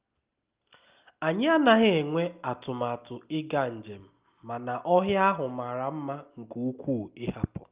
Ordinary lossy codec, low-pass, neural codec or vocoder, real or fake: Opus, 24 kbps; 3.6 kHz; none; real